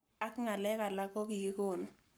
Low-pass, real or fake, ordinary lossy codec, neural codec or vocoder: none; fake; none; codec, 44.1 kHz, 7.8 kbps, Pupu-Codec